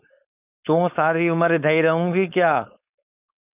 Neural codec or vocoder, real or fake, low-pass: codec, 16 kHz, 4.8 kbps, FACodec; fake; 3.6 kHz